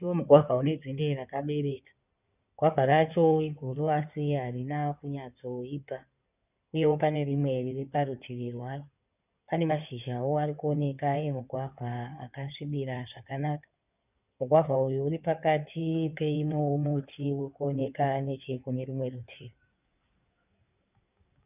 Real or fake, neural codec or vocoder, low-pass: fake; codec, 16 kHz in and 24 kHz out, 2.2 kbps, FireRedTTS-2 codec; 3.6 kHz